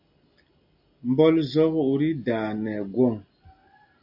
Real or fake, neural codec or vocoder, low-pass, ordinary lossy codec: real; none; 5.4 kHz; AAC, 48 kbps